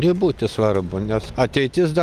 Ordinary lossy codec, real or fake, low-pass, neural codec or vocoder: Opus, 32 kbps; fake; 14.4 kHz; vocoder, 44.1 kHz, 128 mel bands every 256 samples, BigVGAN v2